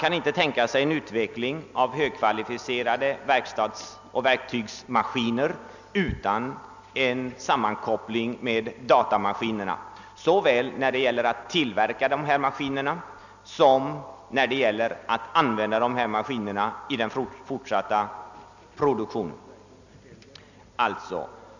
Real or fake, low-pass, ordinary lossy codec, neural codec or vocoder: real; 7.2 kHz; none; none